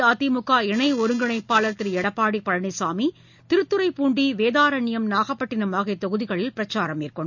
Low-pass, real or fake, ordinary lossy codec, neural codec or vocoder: 7.2 kHz; real; none; none